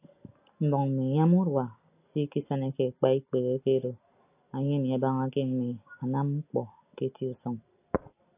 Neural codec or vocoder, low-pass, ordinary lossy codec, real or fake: none; 3.6 kHz; AAC, 24 kbps; real